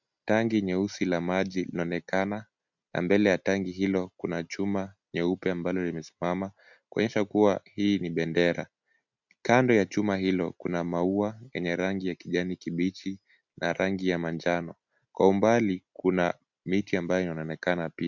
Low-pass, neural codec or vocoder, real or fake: 7.2 kHz; none; real